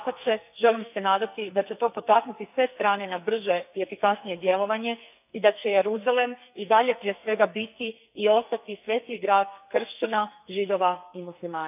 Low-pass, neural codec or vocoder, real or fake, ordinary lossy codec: 3.6 kHz; codec, 44.1 kHz, 2.6 kbps, SNAC; fake; none